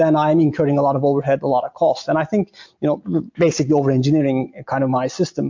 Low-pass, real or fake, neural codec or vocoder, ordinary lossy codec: 7.2 kHz; real; none; MP3, 48 kbps